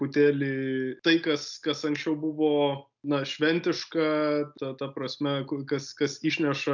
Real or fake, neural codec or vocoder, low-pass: real; none; 7.2 kHz